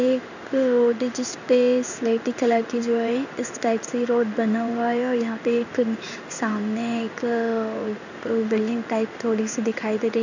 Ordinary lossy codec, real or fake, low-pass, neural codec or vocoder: none; fake; 7.2 kHz; codec, 16 kHz in and 24 kHz out, 1 kbps, XY-Tokenizer